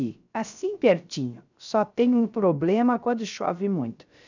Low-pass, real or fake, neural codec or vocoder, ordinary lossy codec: 7.2 kHz; fake; codec, 16 kHz, 0.3 kbps, FocalCodec; none